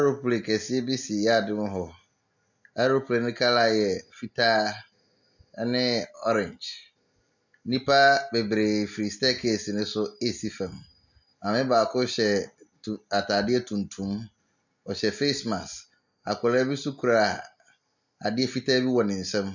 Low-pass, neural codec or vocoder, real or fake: 7.2 kHz; none; real